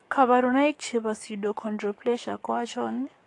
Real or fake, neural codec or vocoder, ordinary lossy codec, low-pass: fake; codec, 44.1 kHz, 7.8 kbps, DAC; AAC, 48 kbps; 10.8 kHz